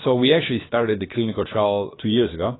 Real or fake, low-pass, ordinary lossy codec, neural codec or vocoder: fake; 7.2 kHz; AAC, 16 kbps; codec, 16 kHz, about 1 kbps, DyCAST, with the encoder's durations